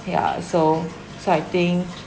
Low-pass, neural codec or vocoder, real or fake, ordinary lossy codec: none; none; real; none